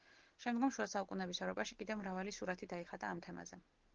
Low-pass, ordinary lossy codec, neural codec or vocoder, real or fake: 7.2 kHz; Opus, 16 kbps; none; real